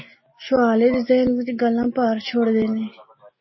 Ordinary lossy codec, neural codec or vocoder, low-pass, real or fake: MP3, 24 kbps; none; 7.2 kHz; real